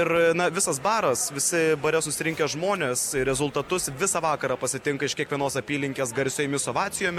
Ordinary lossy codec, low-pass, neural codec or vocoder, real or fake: MP3, 96 kbps; 19.8 kHz; none; real